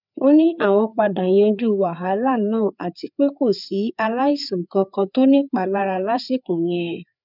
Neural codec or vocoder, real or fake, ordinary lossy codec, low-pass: codec, 16 kHz, 4 kbps, FreqCodec, larger model; fake; none; 5.4 kHz